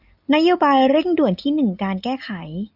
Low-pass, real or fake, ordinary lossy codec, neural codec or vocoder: 5.4 kHz; real; Opus, 64 kbps; none